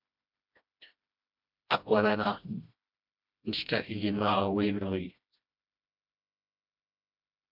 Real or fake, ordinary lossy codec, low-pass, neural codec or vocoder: fake; MP3, 48 kbps; 5.4 kHz; codec, 16 kHz, 1 kbps, FreqCodec, smaller model